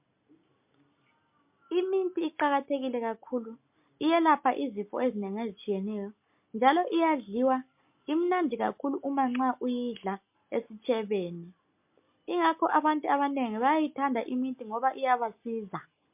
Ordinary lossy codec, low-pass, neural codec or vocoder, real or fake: MP3, 32 kbps; 3.6 kHz; none; real